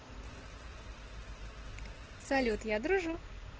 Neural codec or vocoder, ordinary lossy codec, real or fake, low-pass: none; Opus, 16 kbps; real; 7.2 kHz